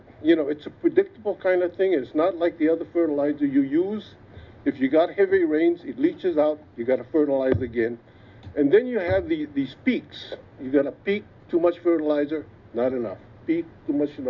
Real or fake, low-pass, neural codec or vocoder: real; 7.2 kHz; none